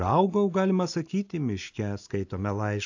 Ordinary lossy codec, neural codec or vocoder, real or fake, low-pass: AAC, 48 kbps; none; real; 7.2 kHz